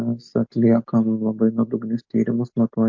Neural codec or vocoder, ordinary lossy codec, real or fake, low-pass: none; MP3, 48 kbps; real; 7.2 kHz